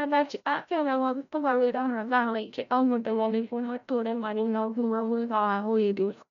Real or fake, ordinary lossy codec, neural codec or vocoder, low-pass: fake; none; codec, 16 kHz, 0.5 kbps, FreqCodec, larger model; 7.2 kHz